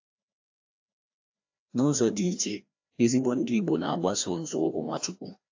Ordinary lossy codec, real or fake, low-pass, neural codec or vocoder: AAC, 48 kbps; fake; 7.2 kHz; codec, 16 kHz, 1 kbps, FreqCodec, larger model